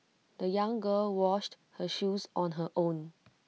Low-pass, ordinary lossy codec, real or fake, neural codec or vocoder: none; none; real; none